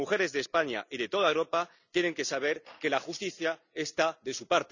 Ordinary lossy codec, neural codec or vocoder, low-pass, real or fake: none; none; 7.2 kHz; real